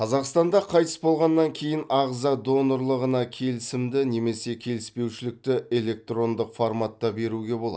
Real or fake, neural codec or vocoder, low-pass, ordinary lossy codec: real; none; none; none